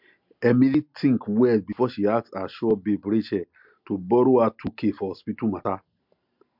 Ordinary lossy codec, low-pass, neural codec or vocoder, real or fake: MP3, 48 kbps; 5.4 kHz; none; real